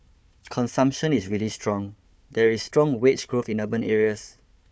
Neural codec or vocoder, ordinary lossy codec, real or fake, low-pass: codec, 16 kHz, 4 kbps, FunCodec, trained on Chinese and English, 50 frames a second; none; fake; none